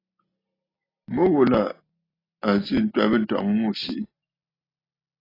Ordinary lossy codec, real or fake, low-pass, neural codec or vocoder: AAC, 24 kbps; real; 5.4 kHz; none